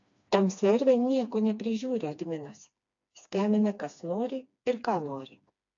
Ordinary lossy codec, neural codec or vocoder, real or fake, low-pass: AAC, 48 kbps; codec, 16 kHz, 2 kbps, FreqCodec, smaller model; fake; 7.2 kHz